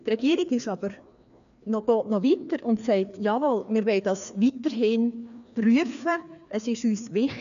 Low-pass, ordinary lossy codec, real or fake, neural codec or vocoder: 7.2 kHz; none; fake; codec, 16 kHz, 2 kbps, FreqCodec, larger model